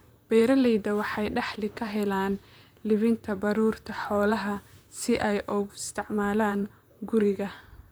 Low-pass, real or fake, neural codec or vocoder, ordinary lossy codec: none; fake; vocoder, 44.1 kHz, 128 mel bands, Pupu-Vocoder; none